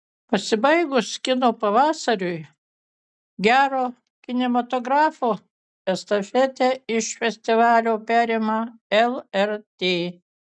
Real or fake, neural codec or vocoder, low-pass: real; none; 9.9 kHz